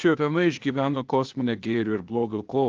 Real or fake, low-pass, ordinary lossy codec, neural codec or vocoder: fake; 7.2 kHz; Opus, 32 kbps; codec, 16 kHz, 0.8 kbps, ZipCodec